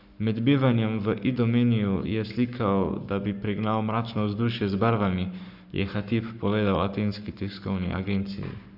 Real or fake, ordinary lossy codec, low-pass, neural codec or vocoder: fake; none; 5.4 kHz; codec, 44.1 kHz, 7.8 kbps, Pupu-Codec